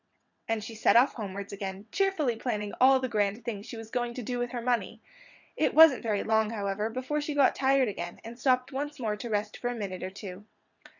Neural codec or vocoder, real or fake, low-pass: vocoder, 22.05 kHz, 80 mel bands, WaveNeXt; fake; 7.2 kHz